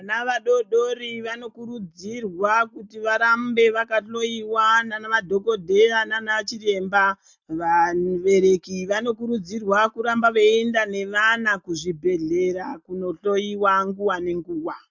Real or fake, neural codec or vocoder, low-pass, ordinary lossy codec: real; none; 7.2 kHz; MP3, 64 kbps